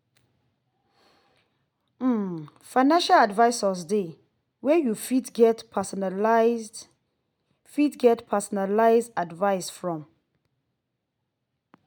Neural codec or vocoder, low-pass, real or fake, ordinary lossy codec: none; none; real; none